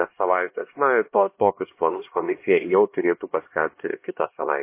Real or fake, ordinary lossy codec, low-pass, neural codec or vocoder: fake; MP3, 24 kbps; 3.6 kHz; codec, 16 kHz, 1 kbps, X-Codec, HuBERT features, trained on LibriSpeech